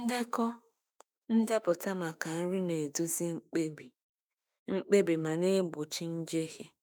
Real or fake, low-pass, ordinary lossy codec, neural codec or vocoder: fake; none; none; autoencoder, 48 kHz, 32 numbers a frame, DAC-VAE, trained on Japanese speech